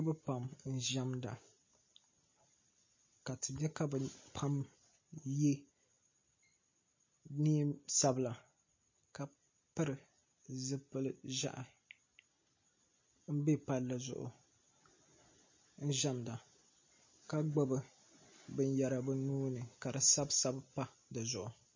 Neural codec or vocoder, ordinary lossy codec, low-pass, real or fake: none; MP3, 32 kbps; 7.2 kHz; real